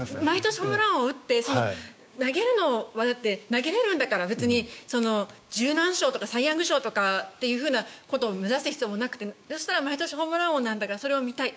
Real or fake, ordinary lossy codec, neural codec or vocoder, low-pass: fake; none; codec, 16 kHz, 6 kbps, DAC; none